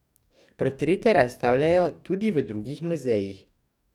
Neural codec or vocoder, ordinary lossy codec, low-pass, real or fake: codec, 44.1 kHz, 2.6 kbps, DAC; none; 19.8 kHz; fake